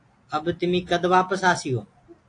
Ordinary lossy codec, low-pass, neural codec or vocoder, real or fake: AAC, 48 kbps; 9.9 kHz; none; real